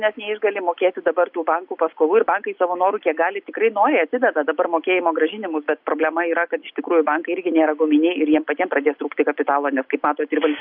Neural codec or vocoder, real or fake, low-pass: none; real; 5.4 kHz